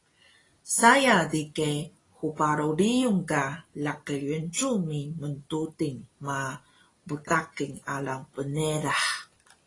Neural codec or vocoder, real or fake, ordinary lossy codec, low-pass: none; real; AAC, 32 kbps; 10.8 kHz